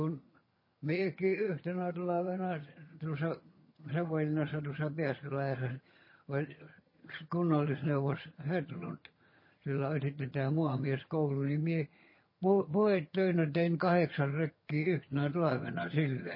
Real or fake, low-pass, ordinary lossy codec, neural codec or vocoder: fake; 5.4 kHz; MP3, 24 kbps; vocoder, 22.05 kHz, 80 mel bands, HiFi-GAN